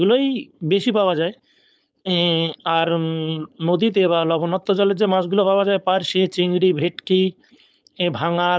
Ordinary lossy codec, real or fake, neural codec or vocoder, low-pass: none; fake; codec, 16 kHz, 4.8 kbps, FACodec; none